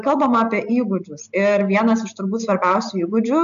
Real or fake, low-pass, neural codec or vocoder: real; 7.2 kHz; none